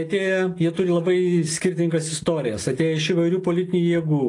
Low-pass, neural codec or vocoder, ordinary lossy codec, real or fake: 10.8 kHz; none; AAC, 48 kbps; real